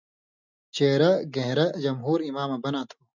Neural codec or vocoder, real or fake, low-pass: none; real; 7.2 kHz